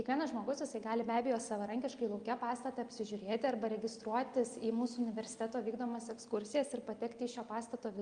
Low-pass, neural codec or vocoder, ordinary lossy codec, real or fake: 9.9 kHz; none; Opus, 32 kbps; real